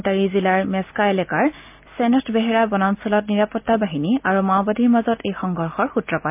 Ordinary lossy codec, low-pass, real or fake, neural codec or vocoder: MP3, 32 kbps; 3.6 kHz; real; none